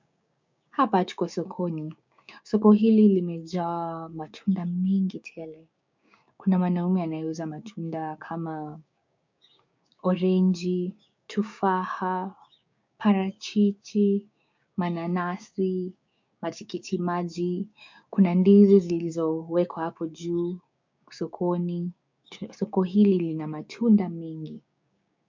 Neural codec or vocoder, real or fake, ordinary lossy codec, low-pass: codec, 16 kHz, 6 kbps, DAC; fake; MP3, 64 kbps; 7.2 kHz